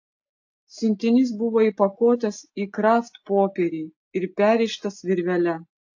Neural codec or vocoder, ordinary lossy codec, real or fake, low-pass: none; AAC, 48 kbps; real; 7.2 kHz